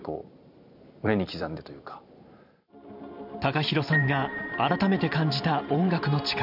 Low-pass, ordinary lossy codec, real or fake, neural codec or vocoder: 5.4 kHz; none; fake; vocoder, 44.1 kHz, 128 mel bands every 512 samples, BigVGAN v2